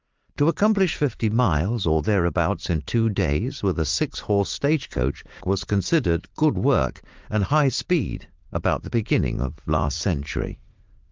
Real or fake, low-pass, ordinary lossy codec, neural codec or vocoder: fake; 7.2 kHz; Opus, 32 kbps; vocoder, 44.1 kHz, 80 mel bands, Vocos